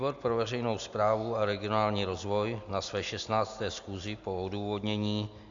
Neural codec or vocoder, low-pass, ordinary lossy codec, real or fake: none; 7.2 kHz; AAC, 64 kbps; real